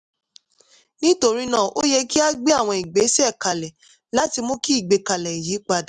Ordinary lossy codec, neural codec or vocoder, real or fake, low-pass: none; none; real; 10.8 kHz